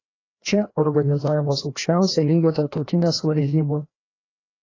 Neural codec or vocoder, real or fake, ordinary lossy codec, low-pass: codec, 16 kHz, 1 kbps, FreqCodec, larger model; fake; AAC, 32 kbps; 7.2 kHz